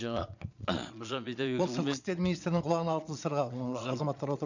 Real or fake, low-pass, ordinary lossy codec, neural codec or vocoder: fake; 7.2 kHz; none; vocoder, 22.05 kHz, 80 mel bands, Vocos